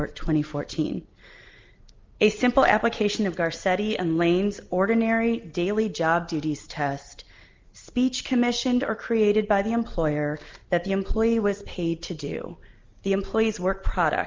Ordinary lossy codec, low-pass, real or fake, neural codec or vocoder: Opus, 32 kbps; 7.2 kHz; real; none